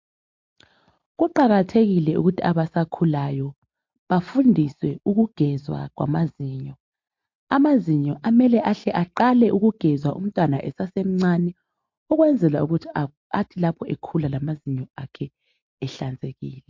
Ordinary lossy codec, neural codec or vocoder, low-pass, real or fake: MP3, 48 kbps; none; 7.2 kHz; real